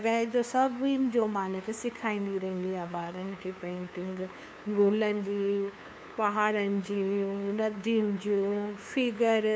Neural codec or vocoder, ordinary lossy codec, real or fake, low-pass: codec, 16 kHz, 2 kbps, FunCodec, trained on LibriTTS, 25 frames a second; none; fake; none